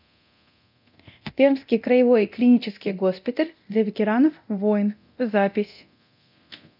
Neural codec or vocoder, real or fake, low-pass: codec, 24 kHz, 0.9 kbps, DualCodec; fake; 5.4 kHz